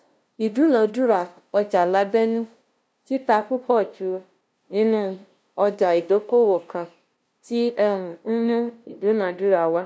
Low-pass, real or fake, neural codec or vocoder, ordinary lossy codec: none; fake; codec, 16 kHz, 0.5 kbps, FunCodec, trained on LibriTTS, 25 frames a second; none